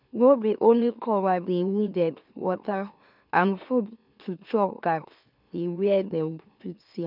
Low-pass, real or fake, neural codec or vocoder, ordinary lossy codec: 5.4 kHz; fake; autoencoder, 44.1 kHz, a latent of 192 numbers a frame, MeloTTS; none